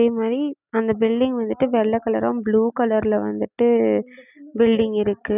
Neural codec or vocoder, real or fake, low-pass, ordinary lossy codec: none; real; 3.6 kHz; none